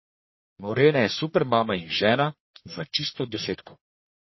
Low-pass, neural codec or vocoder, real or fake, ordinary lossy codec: 7.2 kHz; codec, 44.1 kHz, 1.7 kbps, Pupu-Codec; fake; MP3, 24 kbps